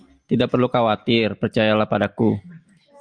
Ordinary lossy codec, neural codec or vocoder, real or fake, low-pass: Opus, 32 kbps; none; real; 9.9 kHz